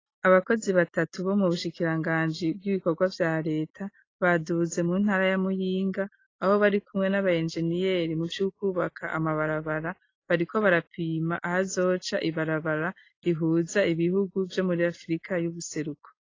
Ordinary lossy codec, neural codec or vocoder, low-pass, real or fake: AAC, 32 kbps; none; 7.2 kHz; real